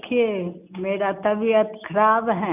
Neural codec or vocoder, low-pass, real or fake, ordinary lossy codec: none; 3.6 kHz; real; none